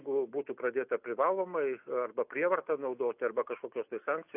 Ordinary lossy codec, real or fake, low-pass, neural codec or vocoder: AAC, 32 kbps; real; 3.6 kHz; none